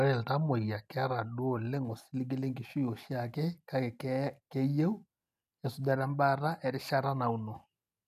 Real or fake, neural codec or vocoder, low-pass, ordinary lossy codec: real; none; 14.4 kHz; none